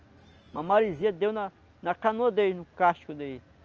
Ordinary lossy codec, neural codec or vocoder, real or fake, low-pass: Opus, 24 kbps; none; real; 7.2 kHz